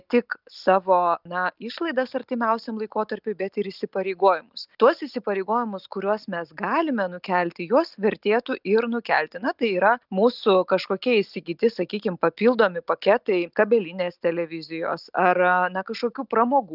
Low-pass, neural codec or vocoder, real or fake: 5.4 kHz; none; real